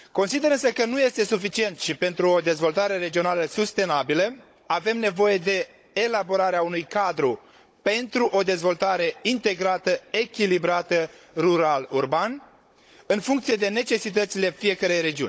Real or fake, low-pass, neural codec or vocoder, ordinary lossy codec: fake; none; codec, 16 kHz, 16 kbps, FunCodec, trained on Chinese and English, 50 frames a second; none